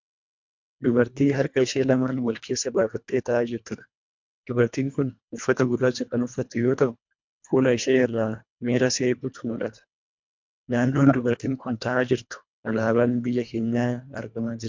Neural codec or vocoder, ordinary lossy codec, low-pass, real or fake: codec, 24 kHz, 1.5 kbps, HILCodec; MP3, 64 kbps; 7.2 kHz; fake